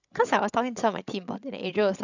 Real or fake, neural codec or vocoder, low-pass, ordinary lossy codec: fake; codec, 16 kHz, 16 kbps, FreqCodec, larger model; 7.2 kHz; none